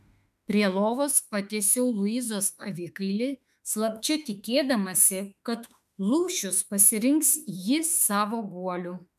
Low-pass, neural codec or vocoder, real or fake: 14.4 kHz; autoencoder, 48 kHz, 32 numbers a frame, DAC-VAE, trained on Japanese speech; fake